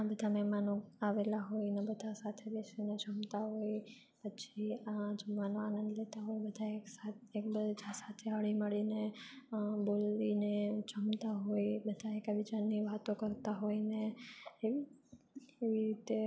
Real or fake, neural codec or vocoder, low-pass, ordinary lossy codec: real; none; none; none